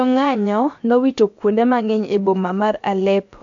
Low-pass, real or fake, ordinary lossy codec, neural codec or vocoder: 7.2 kHz; fake; AAC, 64 kbps; codec, 16 kHz, about 1 kbps, DyCAST, with the encoder's durations